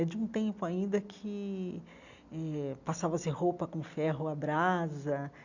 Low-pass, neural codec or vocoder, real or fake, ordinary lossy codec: 7.2 kHz; none; real; none